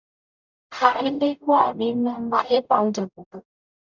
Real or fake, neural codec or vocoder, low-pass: fake; codec, 44.1 kHz, 0.9 kbps, DAC; 7.2 kHz